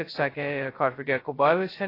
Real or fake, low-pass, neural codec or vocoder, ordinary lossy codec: fake; 5.4 kHz; codec, 16 kHz, 0.2 kbps, FocalCodec; AAC, 24 kbps